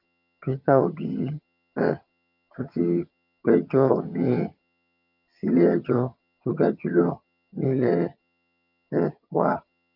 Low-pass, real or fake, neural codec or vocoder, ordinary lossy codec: 5.4 kHz; fake; vocoder, 22.05 kHz, 80 mel bands, HiFi-GAN; none